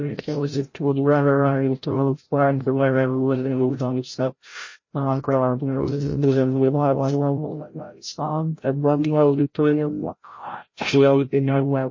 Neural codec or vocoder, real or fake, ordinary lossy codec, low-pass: codec, 16 kHz, 0.5 kbps, FreqCodec, larger model; fake; MP3, 32 kbps; 7.2 kHz